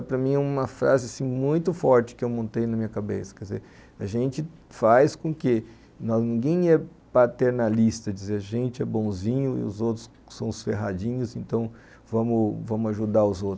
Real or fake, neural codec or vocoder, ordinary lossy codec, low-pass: real; none; none; none